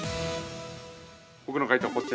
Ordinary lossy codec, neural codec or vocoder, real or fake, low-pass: none; none; real; none